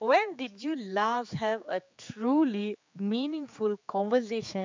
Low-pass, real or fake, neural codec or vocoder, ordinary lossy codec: 7.2 kHz; fake; codec, 16 kHz, 4 kbps, X-Codec, HuBERT features, trained on general audio; MP3, 64 kbps